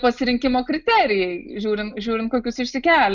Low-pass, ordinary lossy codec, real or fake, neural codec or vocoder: 7.2 kHz; Opus, 64 kbps; real; none